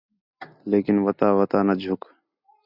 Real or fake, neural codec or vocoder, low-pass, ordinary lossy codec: real; none; 5.4 kHz; Opus, 64 kbps